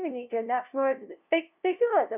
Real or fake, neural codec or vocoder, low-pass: fake; codec, 16 kHz, 0.5 kbps, FunCodec, trained on LibriTTS, 25 frames a second; 3.6 kHz